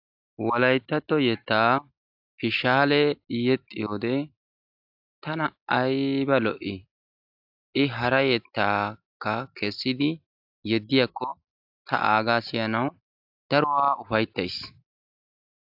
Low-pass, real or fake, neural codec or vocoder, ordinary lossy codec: 5.4 kHz; real; none; AAC, 48 kbps